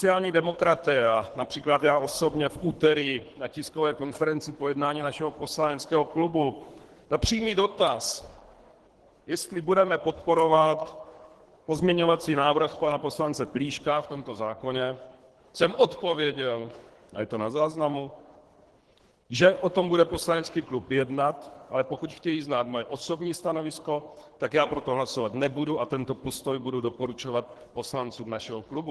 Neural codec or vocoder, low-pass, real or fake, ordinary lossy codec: codec, 24 kHz, 3 kbps, HILCodec; 10.8 kHz; fake; Opus, 16 kbps